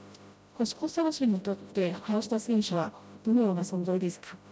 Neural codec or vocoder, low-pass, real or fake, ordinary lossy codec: codec, 16 kHz, 0.5 kbps, FreqCodec, smaller model; none; fake; none